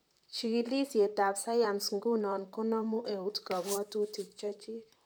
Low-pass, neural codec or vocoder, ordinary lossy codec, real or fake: none; vocoder, 44.1 kHz, 128 mel bands, Pupu-Vocoder; none; fake